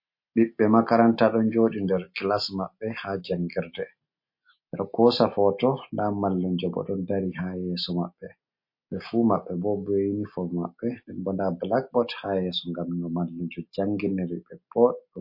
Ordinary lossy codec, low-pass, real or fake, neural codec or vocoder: MP3, 32 kbps; 5.4 kHz; real; none